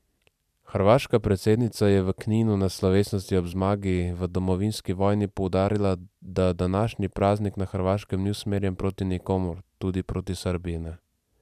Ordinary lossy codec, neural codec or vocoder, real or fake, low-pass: none; none; real; 14.4 kHz